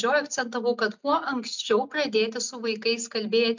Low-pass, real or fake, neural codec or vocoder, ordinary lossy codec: 7.2 kHz; real; none; MP3, 64 kbps